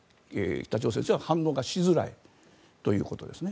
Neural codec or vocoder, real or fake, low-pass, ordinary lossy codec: none; real; none; none